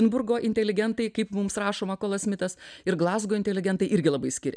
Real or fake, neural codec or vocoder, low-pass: real; none; 9.9 kHz